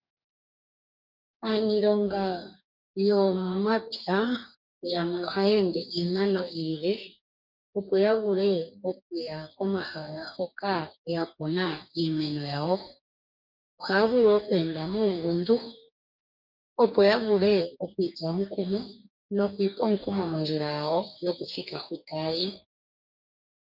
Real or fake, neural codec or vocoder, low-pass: fake; codec, 44.1 kHz, 2.6 kbps, DAC; 5.4 kHz